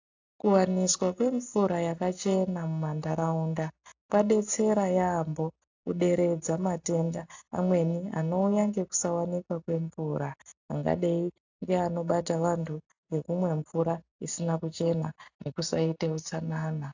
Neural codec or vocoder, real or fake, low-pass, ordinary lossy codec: none; real; 7.2 kHz; AAC, 48 kbps